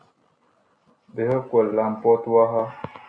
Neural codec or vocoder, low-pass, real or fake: none; 9.9 kHz; real